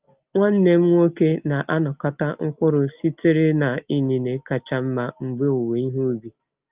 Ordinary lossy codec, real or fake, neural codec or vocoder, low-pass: Opus, 32 kbps; real; none; 3.6 kHz